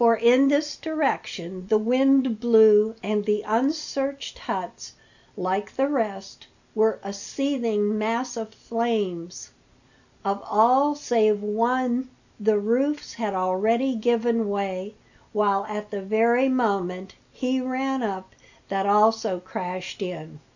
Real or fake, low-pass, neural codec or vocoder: real; 7.2 kHz; none